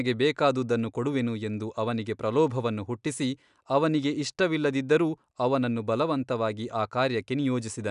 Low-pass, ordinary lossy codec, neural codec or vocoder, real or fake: 10.8 kHz; none; none; real